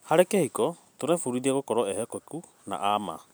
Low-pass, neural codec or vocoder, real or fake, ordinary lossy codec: none; none; real; none